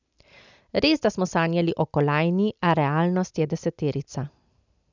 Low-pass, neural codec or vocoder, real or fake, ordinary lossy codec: 7.2 kHz; none; real; none